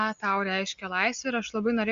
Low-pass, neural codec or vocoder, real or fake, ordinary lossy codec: 7.2 kHz; none; real; Opus, 64 kbps